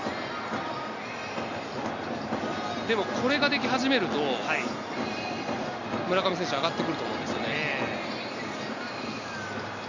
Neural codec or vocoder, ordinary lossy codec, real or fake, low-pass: none; Opus, 64 kbps; real; 7.2 kHz